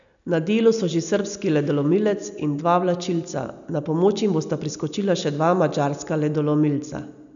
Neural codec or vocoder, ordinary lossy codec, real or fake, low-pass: none; none; real; 7.2 kHz